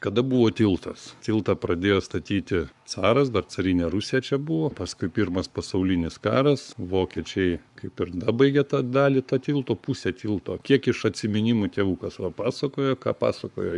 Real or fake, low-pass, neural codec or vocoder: fake; 10.8 kHz; codec, 44.1 kHz, 7.8 kbps, Pupu-Codec